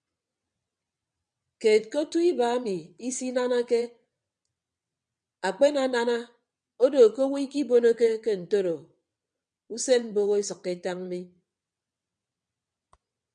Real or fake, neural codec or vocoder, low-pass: fake; vocoder, 22.05 kHz, 80 mel bands, WaveNeXt; 9.9 kHz